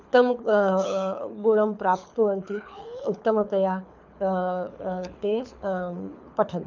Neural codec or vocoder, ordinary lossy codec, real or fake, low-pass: codec, 24 kHz, 6 kbps, HILCodec; none; fake; 7.2 kHz